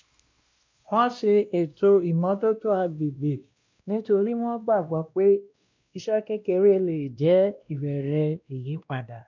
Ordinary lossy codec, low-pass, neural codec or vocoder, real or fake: MP3, 64 kbps; 7.2 kHz; codec, 16 kHz, 1 kbps, X-Codec, WavLM features, trained on Multilingual LibriSpeech; fake